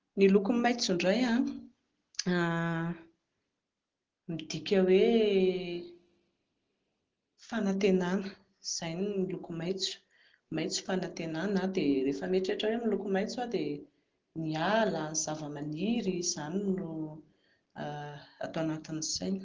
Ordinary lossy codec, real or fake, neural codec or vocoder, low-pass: Opus, 16 kbps; real; none; 7.2 kHz